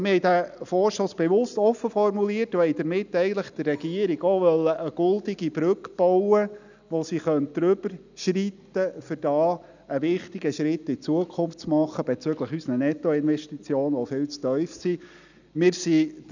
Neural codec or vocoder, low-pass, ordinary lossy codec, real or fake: none; 7.2 kHz; none; real